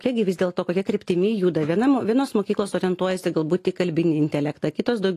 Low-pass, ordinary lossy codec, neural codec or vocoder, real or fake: 14.4 kHz; AAC, 48 kbps; none; real